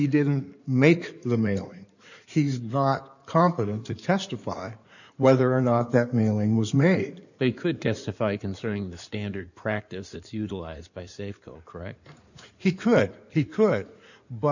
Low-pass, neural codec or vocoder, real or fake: 7.2 kHz; codec, 16 kHz in and 24 kHz out, 2.2 kbps, FireRedTTS-2 codec; fake